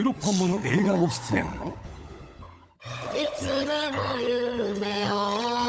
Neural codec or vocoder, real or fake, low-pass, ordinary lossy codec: codec, 16 kHz, 16 kbps, FunCodec, trained on LibriTTS, 50 frames a second; fake; none; none